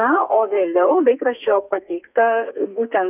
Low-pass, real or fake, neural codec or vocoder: 3.6 kHz; fake; codec, 32 kHz, 1.9 kbps, SNAC